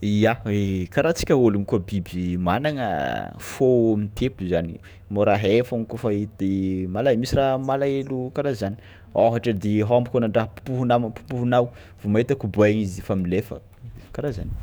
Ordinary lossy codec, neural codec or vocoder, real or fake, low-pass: none; autoencoder, 48 kHz, 128 numbers a frame, DAC-VAE, trained on Japanese speech; fake; none